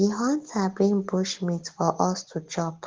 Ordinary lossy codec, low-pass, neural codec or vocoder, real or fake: Opus, 16 kbps; 7.2 kHz; none; real